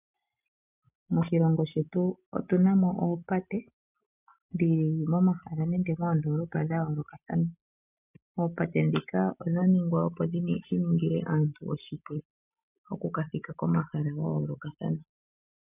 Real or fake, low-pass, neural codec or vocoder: real; 3.6 kHz; none